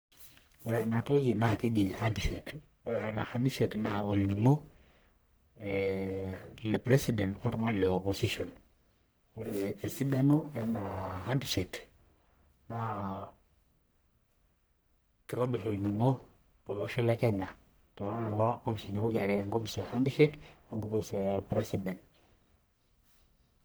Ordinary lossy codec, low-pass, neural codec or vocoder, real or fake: none; none; codec, 44.1 kHz, 1.7 kbps, Pupu-Codec; fake